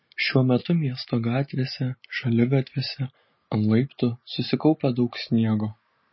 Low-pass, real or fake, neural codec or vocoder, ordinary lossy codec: 7.2 kHz; real; none; MP3, 24 kbps